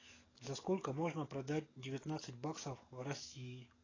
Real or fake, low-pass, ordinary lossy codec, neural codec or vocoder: fake; 7.2 kHz; AAC, 32 kbps; codec, 44.1 kHz, 7.8 kbps, DAC